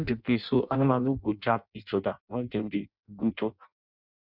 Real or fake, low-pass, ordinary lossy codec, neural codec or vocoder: fake; 5.4 kHz; none; codec, 16 kHz in and 24 kHz out, 0.6 kbps, FireRedTTS-2 codec